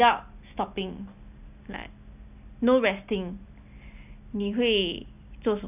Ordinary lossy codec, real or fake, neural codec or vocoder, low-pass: none; real; none; 3.6 kHz